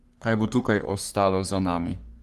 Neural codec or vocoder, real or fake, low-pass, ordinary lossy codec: codec, 44.1 kHz, 3.4 kbps, Pupu-Codec; fake; 14.4 kHz; Opus, 24 kbps